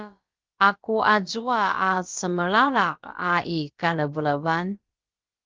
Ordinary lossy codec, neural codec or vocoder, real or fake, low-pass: Opus, 24 kbps; codec, 16 kHz, about 1 kbps, DyCAST, with the encoder's durations; fake; 7.2 kHz